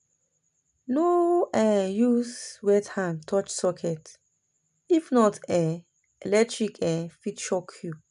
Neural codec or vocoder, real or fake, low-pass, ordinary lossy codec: none; real; 10.8 kHz; none